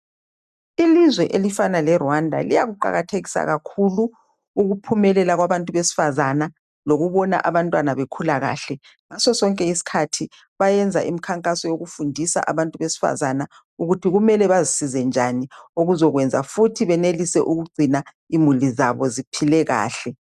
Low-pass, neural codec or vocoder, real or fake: 14.4 kHz; none; real